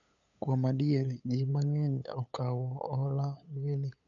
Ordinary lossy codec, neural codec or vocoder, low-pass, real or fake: none; codec, 16 kHz, 8 kbps, FunCodec, trained on LibriTTS, 25 frames a second; 7.2 kHz; fake